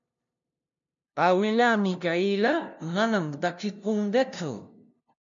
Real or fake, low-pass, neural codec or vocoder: fake; 7.2 kHz; codec, 16 kHz, 0.5 kbps, FunCodec, trained on LibriTTS, 25 frames a second